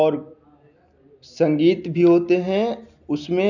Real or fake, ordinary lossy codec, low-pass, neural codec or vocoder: real; none; 7.2 kHz; none